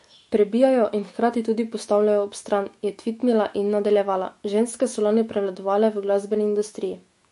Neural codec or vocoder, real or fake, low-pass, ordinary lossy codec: autoencoder, 48 kHz, 128 numbers a frame, DAC-VAE, trained on Japanese speech; fake; 14.4 kHz; MP3, 48 kbps